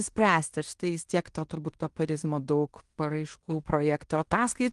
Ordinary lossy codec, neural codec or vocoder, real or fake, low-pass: Opus, 24 kbps; codec, 16 kHz in and 24 kHz out, 0.9 kbps, LongCat-Audio-Codec, fine tuned four codebook decoder; fake; 10.8 kHz